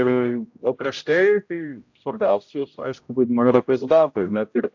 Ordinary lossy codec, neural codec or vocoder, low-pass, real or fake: AAC, 48 kbps; codec, 16 kHz, 0.5 kbps, X-Codec, HuBERT features, trained on general audio; 7.2 kHz; fake